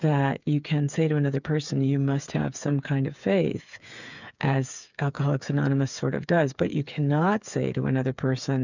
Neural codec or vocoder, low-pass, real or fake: codec, 16 kHz, 8 kbps, FreqCodec, smaller model; 7.2 kHz; fake